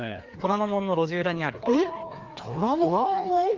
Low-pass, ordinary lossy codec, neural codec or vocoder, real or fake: 7.2 kHz; Opus, 24 kbps; codec, 16 kHz, 2 kbps, FreqCodec, larger model; fake